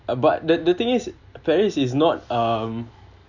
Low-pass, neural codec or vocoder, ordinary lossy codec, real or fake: 7.2 kHz; none; none; real